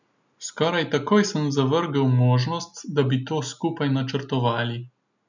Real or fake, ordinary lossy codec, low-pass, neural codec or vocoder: real; none; 7.2 kHz; none